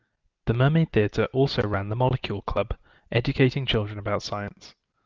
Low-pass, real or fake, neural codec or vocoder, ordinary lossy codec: 7.2 kHz; real; none; Opus, 32 kbps